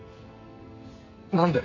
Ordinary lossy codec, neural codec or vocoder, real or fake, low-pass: MP3, 32 kbps; codec, 44.1 kHz, 2.6 kbps, SNAC; fake; 7.2 kHz